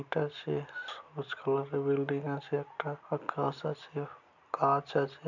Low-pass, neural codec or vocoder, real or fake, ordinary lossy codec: 7.2 kHz; none; real; none